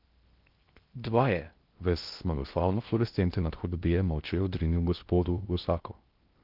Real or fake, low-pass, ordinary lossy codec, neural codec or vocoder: fake; 5.4 kHz; Opus, 32 kbps; codec, 16 kHz in and 24 kHz out, 0.6 kbps, FocalCodec, streaming, 2048 codes